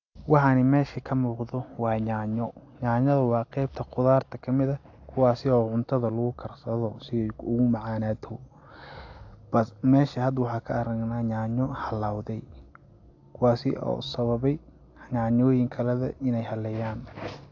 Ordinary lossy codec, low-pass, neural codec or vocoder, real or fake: AAC, 48 kbps; 7.2 kHz; none; real